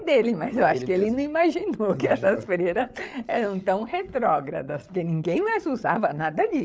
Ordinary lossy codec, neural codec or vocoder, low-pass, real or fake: none; codec, 16 kHz, 8 kbps, FreqCodec, larger model; none; fake